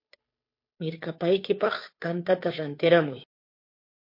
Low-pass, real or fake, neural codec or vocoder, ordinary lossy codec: 5.4 kHz; fake; codec, 16 kHz, 2 kbps, FunCodec, trained on Chinese and English, 25 frames a second; MP3, 32 kbps